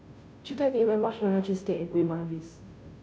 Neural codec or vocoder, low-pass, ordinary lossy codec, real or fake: codec, 16 kHz, 0.5 kbps, FunCodec, trained on Chinese and English, 25 frames a second; none; none; fake